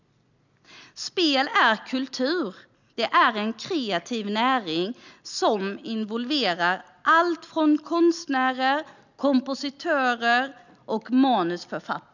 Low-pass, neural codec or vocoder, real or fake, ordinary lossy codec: 7.2 kHz; none; real; none